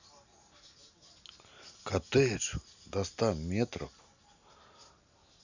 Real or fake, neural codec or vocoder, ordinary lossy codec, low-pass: real; none; none; 7.2 kHz